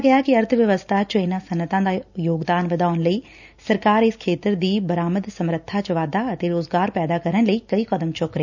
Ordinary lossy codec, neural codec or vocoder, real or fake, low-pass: none; none; real; 7.2 kHz